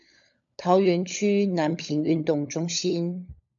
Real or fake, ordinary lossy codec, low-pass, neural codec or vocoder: fake; MP3, 96 kbps; 7.2 kHz; codec, 16 kHz, 16 kbps, FunCodec, trained on LibriTTS, 50 frames a second